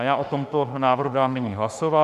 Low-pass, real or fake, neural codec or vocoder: 14.4 kHz; fake; autoencoder, 48 kHz, 32 numbers a frame, DAC-VAE, trained on Japanese speech